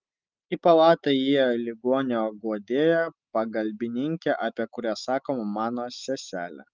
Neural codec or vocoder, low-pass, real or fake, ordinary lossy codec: none; 7.2 kHz; real; Opus, 24 kbps